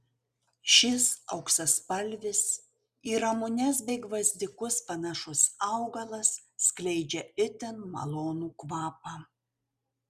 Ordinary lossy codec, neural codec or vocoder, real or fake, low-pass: Opus, 64 kbps; none; real; 14.4 kHz